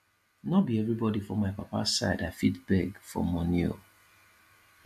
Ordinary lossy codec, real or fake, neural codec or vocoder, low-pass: MP3, 64 kbps; real; none; 14.4 kHz